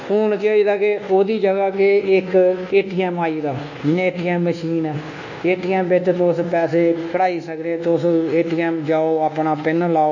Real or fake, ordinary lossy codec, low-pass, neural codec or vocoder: fake; none; 7.2 kHz; codec, 24 kHz, 1.2 kbps, DualCodec